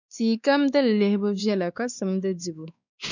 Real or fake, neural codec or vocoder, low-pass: fake; codec, 16 kHz, 2 kbps, X-Codec, WavLM features, trained on Multilingual LibriSpeech; 7.2 kHz